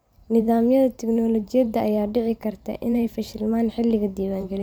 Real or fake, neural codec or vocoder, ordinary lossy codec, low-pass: fake; vocoder, 44.1 kHz, 128 mel bands every 512 samples, BigVGAN v2; none; none